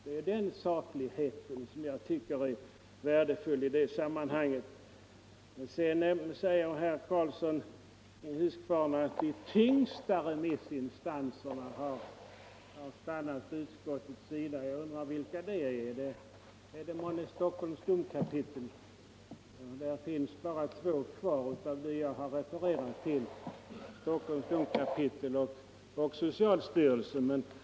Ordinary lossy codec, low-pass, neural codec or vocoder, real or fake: none; none; none; real